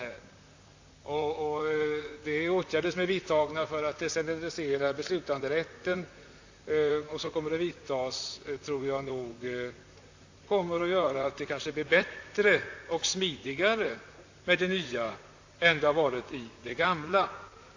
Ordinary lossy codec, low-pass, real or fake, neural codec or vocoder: AAC, 48 kbps; 7.2 kHz; fake; vocoder, 44.1 kHz, 128 mel bands, Pupu-Vocoder